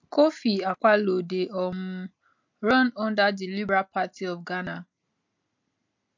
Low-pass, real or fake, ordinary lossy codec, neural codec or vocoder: 7.2 kHz; real; MP3, 48 kbps; none